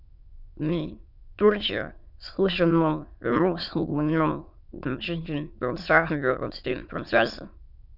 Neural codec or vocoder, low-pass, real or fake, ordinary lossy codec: autoencoder, 22.05 kHz, a latent of 192 numbers a frame, VITS, trained on many speakers; 5.4 kHz; fake; none